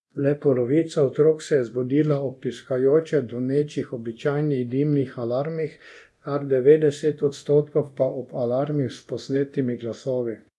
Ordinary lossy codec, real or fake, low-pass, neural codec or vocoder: none; fake; none; codec, 24 kHz, 0.9 kbps, DualCodec